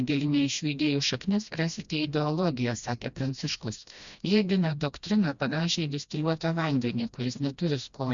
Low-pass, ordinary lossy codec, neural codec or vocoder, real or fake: 7.2 kHz; Opus, 64 kbps; codec, 16 kHz, 1 kbps, FreqCodec, smaller model; fake